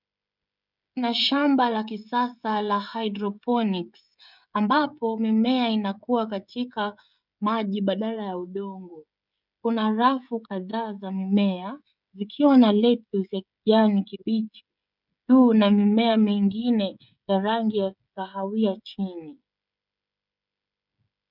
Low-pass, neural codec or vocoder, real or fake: 5.4 kHz; codec, 16 kHz, 16 kbps, FreqCodec, smaller model; fake